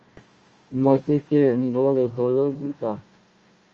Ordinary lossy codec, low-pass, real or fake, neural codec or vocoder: Opus, 24 kbps; 7.2 kHz; fake; codec, 16 kHz, 1 kbps, FunCodec, trained on Chinese and English, 50 frames a second